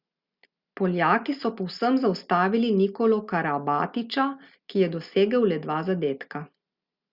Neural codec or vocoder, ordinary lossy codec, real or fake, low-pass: none; Opus, 64 kbps; real; 5.4 kHz